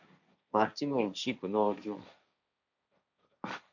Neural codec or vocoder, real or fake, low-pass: codec, 16 kHz, 1.1 kbps, Voila-Tokenizer; fake; 7.2 kHz